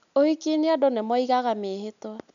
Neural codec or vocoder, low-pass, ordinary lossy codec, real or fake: none; 7.2 kHz; none; real